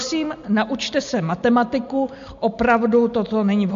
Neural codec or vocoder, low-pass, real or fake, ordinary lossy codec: none; 7.2 kHz; real; MP3, 48 kbps